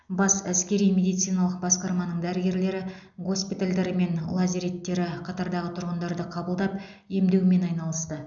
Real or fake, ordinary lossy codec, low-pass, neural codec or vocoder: real; none; 7.2 kHz; none